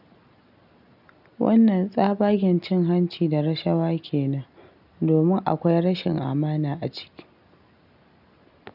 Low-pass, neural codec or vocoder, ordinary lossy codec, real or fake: 5.4 kHz; none; none; real